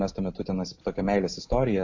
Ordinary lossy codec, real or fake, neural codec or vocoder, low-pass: AAC, 48 kbps; real; none; 7.2 kHz